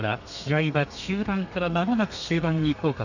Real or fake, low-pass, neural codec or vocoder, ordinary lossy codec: fake; 7.2 kHz; codec, 32 kHz, 1.9 kbps, SNAC; none